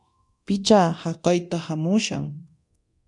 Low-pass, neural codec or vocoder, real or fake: 10.8 kHz; codec, 24 kHz, 0.9 kbps, DualCodec; fake